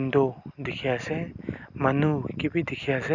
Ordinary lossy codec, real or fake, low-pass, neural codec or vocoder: none; real; 7.2 kHz; none